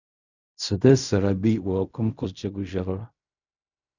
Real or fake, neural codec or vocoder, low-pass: fake; codec, 16 kHz in and 24 kHz out, 0.4 kbps, LongCat-Audio-Codec, fine tuned four codebook decoder; 7.2 kHz